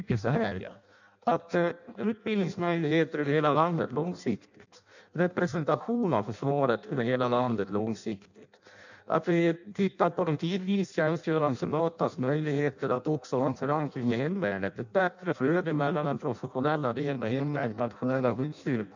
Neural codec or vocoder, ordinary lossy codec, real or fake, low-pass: codec, 16 kHz in and 24 kHz out, 0.6 kbps, FireRedTTS-2 codec; none; fake; 7.2 kHz